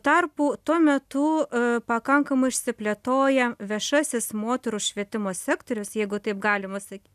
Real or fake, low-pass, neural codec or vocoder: real; 14.4 kHz; none